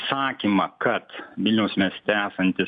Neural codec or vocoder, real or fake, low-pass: none; real; 9.9 kHz